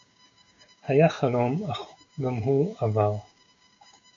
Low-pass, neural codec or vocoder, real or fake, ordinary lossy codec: 7.2 kHz; codec, 16 kHz, 6 kbps, DAC; fake; MP3, 64 kbps